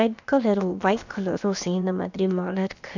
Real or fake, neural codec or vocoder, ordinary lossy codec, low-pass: fake; codec, 16 kHz, about 1 kbps, DyCAST, with the encoder's durations; none; 7.2 kHz